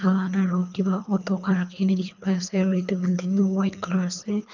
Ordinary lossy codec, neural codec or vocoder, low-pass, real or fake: none; codec, 24 kHz, 3 kbps, HILCodec; 7.2 kHz; fake